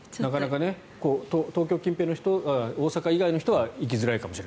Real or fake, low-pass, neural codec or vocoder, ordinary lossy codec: real; none; none; none